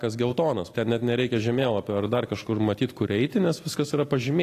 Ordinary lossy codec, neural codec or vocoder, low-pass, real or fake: AAC, 48 kbps; none; 14.4 kHz; real